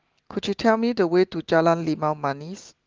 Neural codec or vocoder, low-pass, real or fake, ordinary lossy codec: none; 7.2 kHz; real; Opus, 16 kbps